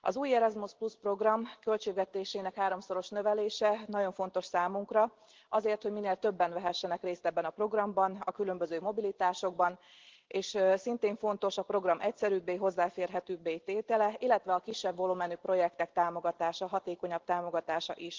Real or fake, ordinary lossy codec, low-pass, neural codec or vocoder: real; Opus, 16 kbps; 7.2 kHz; none